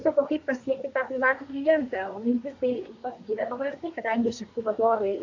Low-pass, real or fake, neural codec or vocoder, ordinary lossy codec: 7.2 kHz; fake; codec, 24 kHz, 1 kbps, SNAC; AAC, 48 kbps